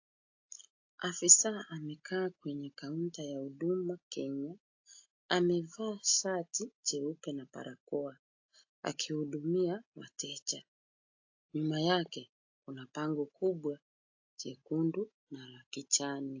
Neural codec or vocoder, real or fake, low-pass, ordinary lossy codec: none; real; 7.2 kHz; AAC, 48 kbps